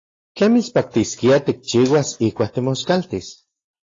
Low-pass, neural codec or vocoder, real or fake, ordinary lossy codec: 7.2 kHz; none; real; AAC, 32 kbps